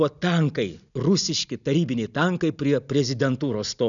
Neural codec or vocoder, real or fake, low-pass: none; real; 7.2 kHz